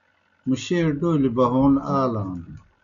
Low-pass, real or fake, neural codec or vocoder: 7.2 kHz; real; none